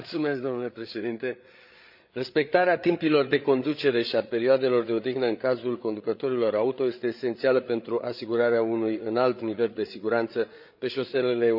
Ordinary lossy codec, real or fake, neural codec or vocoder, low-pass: none; fake; codec, 16 kHz, 8 kbps, FreqCodec, larger model; 5.4 kHz